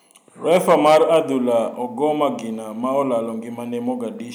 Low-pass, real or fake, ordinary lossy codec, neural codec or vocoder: none; real; none; none